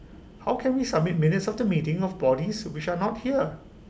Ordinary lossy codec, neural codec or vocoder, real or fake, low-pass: none; none; real; none